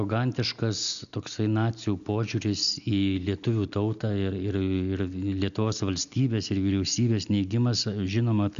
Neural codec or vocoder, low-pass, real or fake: none; 7.2 kHz; real